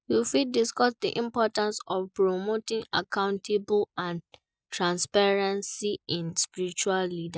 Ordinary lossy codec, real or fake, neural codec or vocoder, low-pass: none; real; none; none